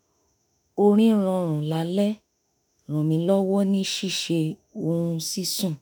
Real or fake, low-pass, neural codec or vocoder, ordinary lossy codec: fake; none; autoencoder, 48 kHz, 32 numbers a frame, DAC-VAE, trained on Japanese speech; none